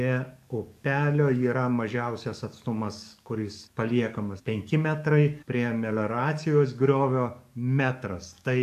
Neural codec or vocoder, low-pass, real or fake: codec, 44.1 kHz, 7.8 kbps, DAC; 14.4 kHz; fake